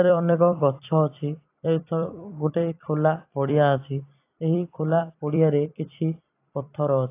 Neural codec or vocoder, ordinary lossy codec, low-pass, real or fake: vocoder, 44.1 kHz, 128 mel bands every 256 samples, BigVGAN v2; AAC, 24 kbps; 3.6 kHz; fake